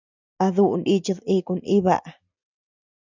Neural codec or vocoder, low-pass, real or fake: none; 7.2 kHz; real